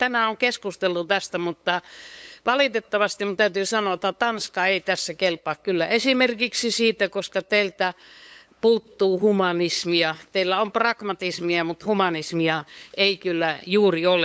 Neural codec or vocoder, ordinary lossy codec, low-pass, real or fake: codec, 16 kHz, 8 kbps, FunCodec, trained on LibriTTS, 25 frames a second; none; none; fake